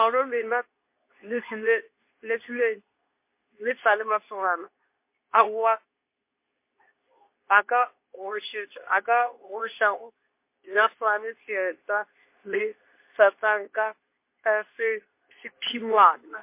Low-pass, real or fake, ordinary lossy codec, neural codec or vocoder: 3.6 kHz; fake; MP3, 24 kbps; codec, 24 kHz, 0.9 kbps, WavTokenizer, medium speech release version 2